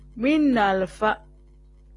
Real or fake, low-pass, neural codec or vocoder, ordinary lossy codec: real; 10.8 kHz; none; AAC, 32 kbps